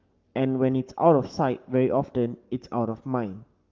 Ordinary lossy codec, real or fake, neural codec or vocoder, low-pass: Opus, 24 kbps; fake; codec, 44.1 kHz, 7.8 kbps, Pupu-Codec; 7.2 kHz